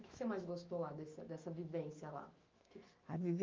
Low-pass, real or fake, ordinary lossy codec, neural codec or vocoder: 7.2 kHz; fake; Opus, 32 kbps; autoencoder, 48 kHz, 128 numbers a frame, DAC-VAE, trained on Japanese speech